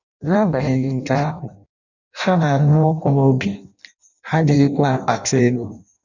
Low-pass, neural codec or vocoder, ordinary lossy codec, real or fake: 7.2 kHz; codec, 16 kHz in and 24 kHz out, 0.6 kbps, FireRedTTS-2 codec; none; fake